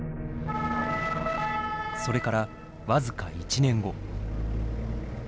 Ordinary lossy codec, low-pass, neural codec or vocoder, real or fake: none; none; none; real